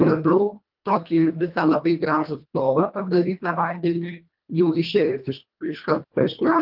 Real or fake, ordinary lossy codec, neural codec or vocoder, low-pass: fake; Opus, 24 kbps; codec, 24 kHz, 1.5 kbps, HILCodec; 5.4 kHz